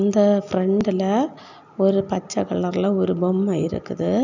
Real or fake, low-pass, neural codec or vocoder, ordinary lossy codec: real; 7.2 kHz; none; none